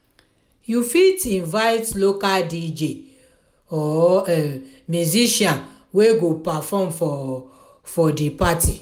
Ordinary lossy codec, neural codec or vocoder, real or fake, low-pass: none; none; real; none